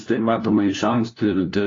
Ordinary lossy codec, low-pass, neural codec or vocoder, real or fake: AAC, 32 kbps; 7.2 kHz; codec, 16 kHz, 1 kbps, FunCodec, trained on LibriTTS, 50 frames a second; fake